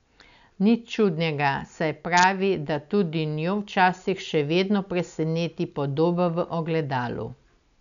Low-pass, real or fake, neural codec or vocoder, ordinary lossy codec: 7.2 kHz; real; none; none